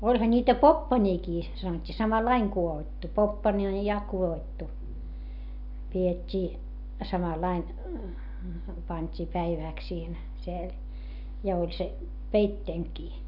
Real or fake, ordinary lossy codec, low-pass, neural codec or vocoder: real; none; 5.4 kHz; none